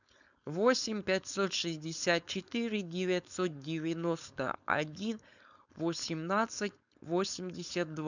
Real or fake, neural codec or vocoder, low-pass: fake; codec, 16 kHz, 4.8 kbps, FACodec; 7.2 kHz